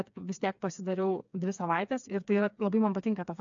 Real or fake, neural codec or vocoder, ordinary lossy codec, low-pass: fake; codec, 16 kHz, 4 kbps, FreqCodec, smaller model; AAC, 64 kbps; 7.2 kHz